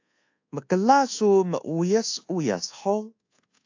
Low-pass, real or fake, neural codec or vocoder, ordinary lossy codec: 7.2 kHz; fake; codec, 24 kHz, 1.2 kbps, DualCodec; AAC, 48 kbps